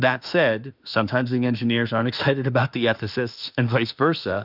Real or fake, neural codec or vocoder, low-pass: fake; autoencoder, 48 kHz, 32 numbers a frame, DAC-VAE, trained on Japanese speech; 5.4 kHz